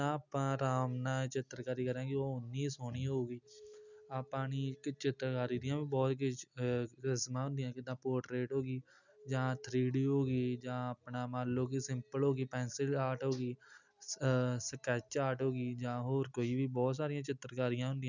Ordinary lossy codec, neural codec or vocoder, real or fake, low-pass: none; none; real; 7.2 kHz